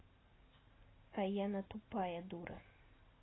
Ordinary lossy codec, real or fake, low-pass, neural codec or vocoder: AAC, 16 kbps; real; 7.2 kHz; none